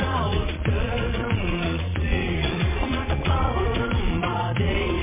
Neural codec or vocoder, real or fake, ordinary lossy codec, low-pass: vocoder, 22.05 kHz, 80 mel bands, Vocos; fake; MP3, 16 kbps; 3.6 kHz